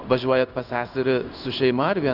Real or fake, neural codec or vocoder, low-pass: fake; codec, 16 kHz in and 24 kHz out, 1 kbps, XY-Tokenizer; 5.4 kHz